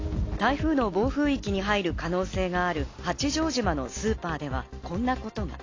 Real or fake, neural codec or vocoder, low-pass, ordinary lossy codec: real; none; 7.2 kHz; AAC, 32 kbps